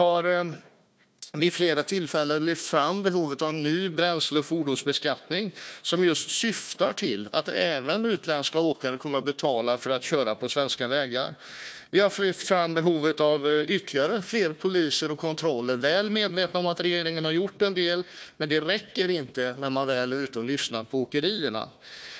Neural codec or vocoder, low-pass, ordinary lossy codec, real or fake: codec, 16 kHz, 1 kbps, FunCodec, trained on Chinese and English, 50 frames a second; none; none; fake